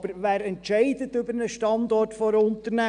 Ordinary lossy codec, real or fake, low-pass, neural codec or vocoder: none; real; 9.9 kHz; none